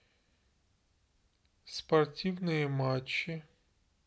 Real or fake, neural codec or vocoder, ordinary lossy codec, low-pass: real; none; none; none